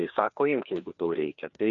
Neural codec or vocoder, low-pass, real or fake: codec, 16 kHz, 2 kbps, FreqCodec, larger model; 7.2 kHz; fake